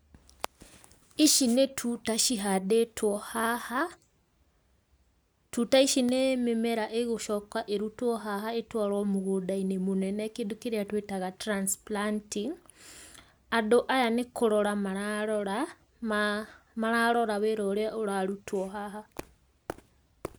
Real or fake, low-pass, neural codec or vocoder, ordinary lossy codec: real; none; none; none